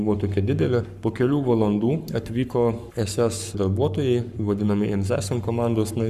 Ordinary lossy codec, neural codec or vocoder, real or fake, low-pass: Opus, 64 kbps; codec, 44.1 kHz, 7.8 kbps, Pupu-Codec; fake; 14.4 kHz